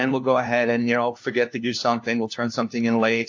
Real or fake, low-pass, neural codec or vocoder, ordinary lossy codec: fake; 7.2 kHz; codec, 16 kHz, 1 kbps, FunCodec, trained on LibriTTS, 50 frames a second; AAC, 48 kbps